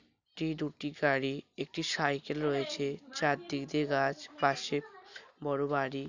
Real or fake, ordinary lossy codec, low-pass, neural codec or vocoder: real; none; 7.2 kHz; none